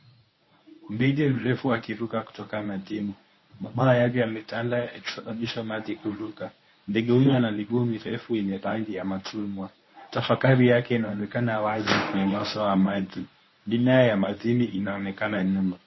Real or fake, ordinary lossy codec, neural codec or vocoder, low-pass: fake; MP3, 24 kbps; codec, 24 kHz, 0.9 kbps, WavTokenizer, medium speech release version 1; 7.2 kHz